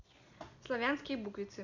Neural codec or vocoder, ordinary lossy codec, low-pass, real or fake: none; none; 7.2 kHz; real